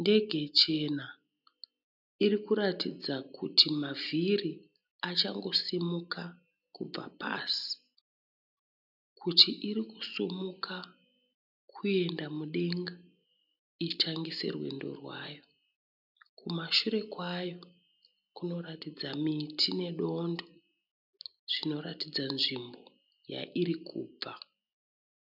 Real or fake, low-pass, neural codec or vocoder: real; 5.4 kHz; none